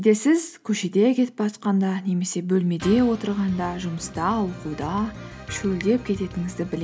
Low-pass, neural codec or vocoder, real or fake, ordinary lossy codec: none; none; real; none